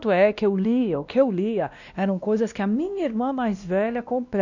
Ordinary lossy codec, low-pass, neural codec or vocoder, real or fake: none; 7.2 kHz; codec, 16 kHz, 1 kbps, X-Codec, WavLM features, trained on Multilingual LibriSpeech; fake